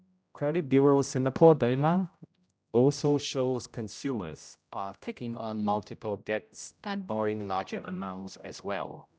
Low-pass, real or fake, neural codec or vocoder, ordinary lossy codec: none; fake; codec, 16 kHz, 0.5 kbps, X-Codec, HuBERT features, trained on general audio; none